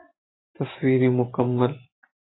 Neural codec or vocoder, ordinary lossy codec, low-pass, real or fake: vocoder, 44.1 kHz, 128 mel bands every 512 samples, BigVGAN v2; AAC, 16 kbps; 7.2 kHz; fake